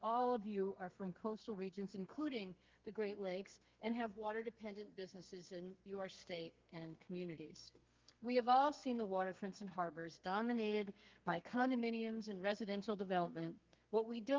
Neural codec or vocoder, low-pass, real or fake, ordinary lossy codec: codec, 32 kHz, 1.9 kbps, SNAC; 7.2 kHz; fake; Opus, 24 kbps